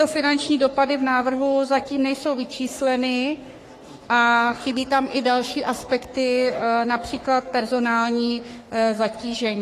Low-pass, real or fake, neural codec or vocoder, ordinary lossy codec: 14.4 kHz; fake; codec, 44.1 kHz, 3.4 kbps, Pupu-Codec; AAC, 48 kbps